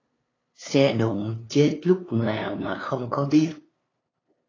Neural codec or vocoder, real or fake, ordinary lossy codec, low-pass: codec, 16 kHz, 2 kbps, FunCodec, trained on LibriTTS, 25 frames a second; fake; AAC, 32 kbps; 7.2 kHz